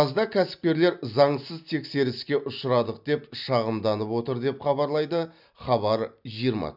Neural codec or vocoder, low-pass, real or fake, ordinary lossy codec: none; 5.4 kHz; real; none